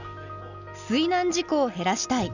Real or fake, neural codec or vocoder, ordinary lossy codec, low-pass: real; none; none; 7.2 kHz